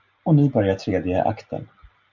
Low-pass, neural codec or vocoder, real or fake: 7.2 kHz; none; real